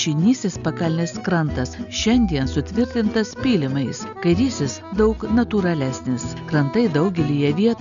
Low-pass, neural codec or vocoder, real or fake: 7.2 kHz; none; real